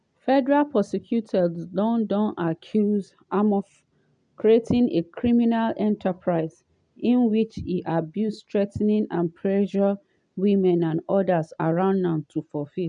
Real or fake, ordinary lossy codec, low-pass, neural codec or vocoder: real; none; 9.9 kHz; none